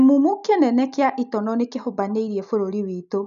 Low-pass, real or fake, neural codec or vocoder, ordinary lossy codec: 7.2 kHz; real; none; none